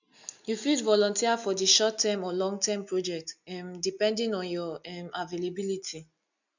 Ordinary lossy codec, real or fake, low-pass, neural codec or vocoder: none; real; 7.2 kHz; none